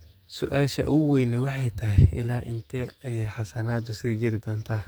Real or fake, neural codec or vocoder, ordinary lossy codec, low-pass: fake; codec, 44.1 kHz, 2.6 kbps, SNAC; none; none